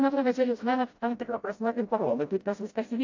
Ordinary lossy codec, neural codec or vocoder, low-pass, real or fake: AAC, 48 kbps; codec, 16 kHz, 0.5 kbps, FreqCodec, smaller model; 7.2 kHz; fake